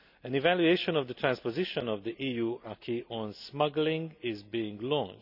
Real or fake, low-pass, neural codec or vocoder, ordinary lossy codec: real; 5.4 kHz; none; none